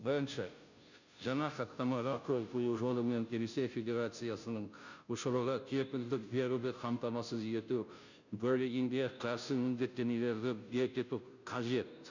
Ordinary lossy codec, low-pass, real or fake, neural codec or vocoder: none; 7.2 kHz; fake; codec, 16 kHz, 0.5 kbps, FunCodec, trained on Chinese and English, 25 frames a second